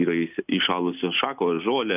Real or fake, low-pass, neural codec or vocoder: real; 3.6 kHz; none